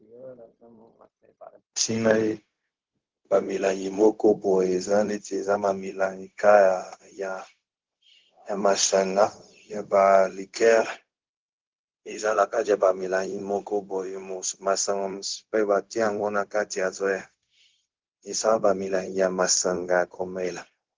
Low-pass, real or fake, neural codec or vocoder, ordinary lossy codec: 7.2 kHz; fake; codec, 16 kHz, 0.4 kbps, LongCat-Audio-Codec; Opus, 16 kbps